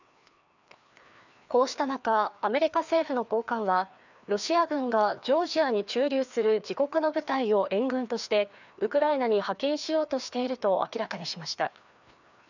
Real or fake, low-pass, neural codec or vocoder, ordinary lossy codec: fake; 7.2 kHz; codec, 16 kHz, 2 kbps, FreqCodec, larger model; none